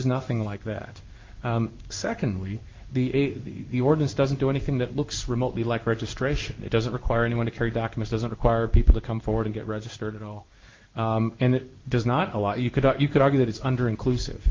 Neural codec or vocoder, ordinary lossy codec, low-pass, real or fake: codec, 16 kHz in and 24 kHz out, 1 kbps, XY-Tokenizer; Opus, 32 kbps; 7.2 kHz; fake